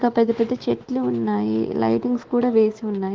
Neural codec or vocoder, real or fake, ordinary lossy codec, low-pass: autoencoder, 48 kHz, 128 numbers a frame, DAC-VAE, trained on Japanese speech; fake; Opus, 16 kbps; 7.2 kHz